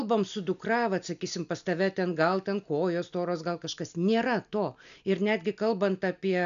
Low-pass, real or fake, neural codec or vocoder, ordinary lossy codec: 7.2 kHz; real; none; MP3, 96 kbps